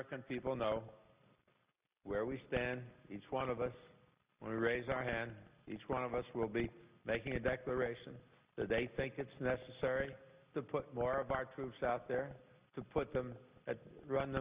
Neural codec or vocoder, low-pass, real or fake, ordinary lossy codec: none; 3.6 kHz; real; Opus, 64 kbps